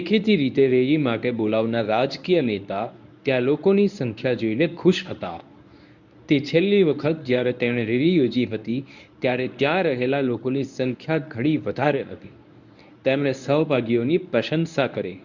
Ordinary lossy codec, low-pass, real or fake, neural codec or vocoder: none; 7.2 kHz; fake; codec, 24 kHz, 0.9 kbps, WavTokenizer, medium speech release version 1